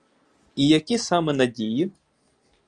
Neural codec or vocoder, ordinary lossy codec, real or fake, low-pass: none; Opus, 32 kbps; real; 9.9 kHz